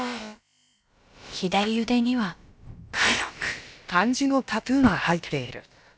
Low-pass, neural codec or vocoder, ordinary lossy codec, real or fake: none; codec, 16 kHz, about 1 kbps, DyCAST, with the encoder's durations; none; fake